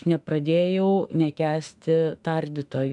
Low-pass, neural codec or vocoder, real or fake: 10.8 kHz; autoencoder, 48 kHz, 32 numbers a frame, DAC-VAE, trained on Japanese speech; fake